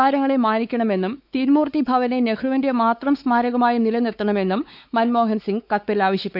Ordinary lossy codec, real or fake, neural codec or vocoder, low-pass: none; fake; codec, 16 kHz, 4 kbps, X-Codec, WavLM features, trained on Multilingual LibriSpeech; 5.4 kHz